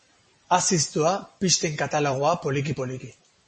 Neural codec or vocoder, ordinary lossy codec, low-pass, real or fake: none; MP3, 32 kbps; 10.8 kHz; real